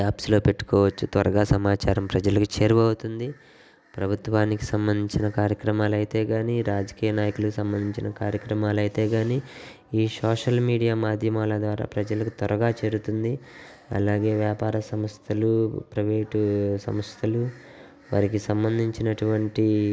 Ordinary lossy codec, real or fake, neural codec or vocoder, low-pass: none; real; none; none